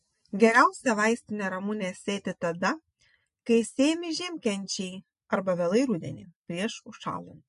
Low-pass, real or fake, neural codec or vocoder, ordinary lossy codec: 14.4 kHz; real; none; MP3, 48 kbps